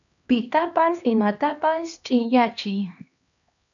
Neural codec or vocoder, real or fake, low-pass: codec, 16 kHz, 2 kbps, X-Codec, HuBERT features, trained on LibriSpeech; fake; 7.2 kHz